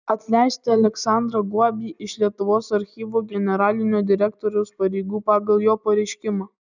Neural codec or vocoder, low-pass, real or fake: none; 7.2 kHz; real